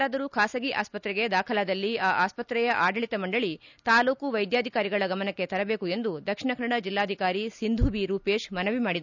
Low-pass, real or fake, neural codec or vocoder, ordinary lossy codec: 7.2 kHz; real; none; none